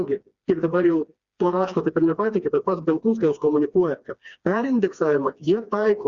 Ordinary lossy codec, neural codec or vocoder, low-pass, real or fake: Opus, 64 kbps; codec, 16 kHz, 2 kbps, FreqCodec, smaller model; 7.2 kHz; fake